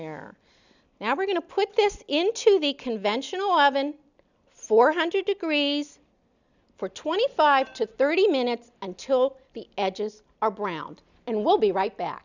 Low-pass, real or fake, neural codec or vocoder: 7.2 kHz; real; none